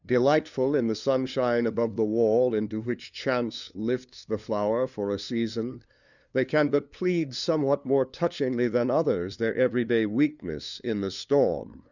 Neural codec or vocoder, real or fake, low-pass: codec, 16 kHz, 2 kbps, FunCodec, trained on LibriTTS, 25 frames a second; fake; 7.2 kHz